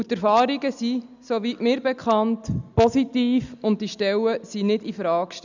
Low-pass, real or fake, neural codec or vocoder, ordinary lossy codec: 7.2 kHz; real; none; none